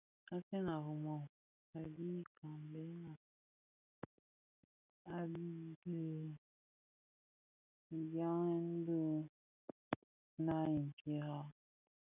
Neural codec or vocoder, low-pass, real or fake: none; 3.6 kHz; real